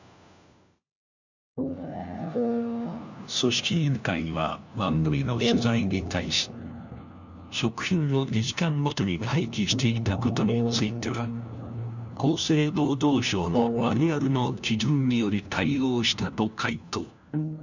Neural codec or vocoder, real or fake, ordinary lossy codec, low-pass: codec, 16 kHz, 1 kbps, FunCodec, trained on LibriTTS, 50 frames a second; fake; none; 7.2 kHz